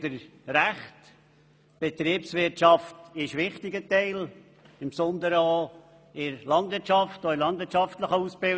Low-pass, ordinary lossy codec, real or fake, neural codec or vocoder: none; none; real; none